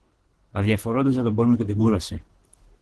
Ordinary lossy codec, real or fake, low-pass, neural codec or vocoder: Opus, 16 kbps; fake; 10.8 kHz; codec, 24 kHz, 3 kbps, HILCodec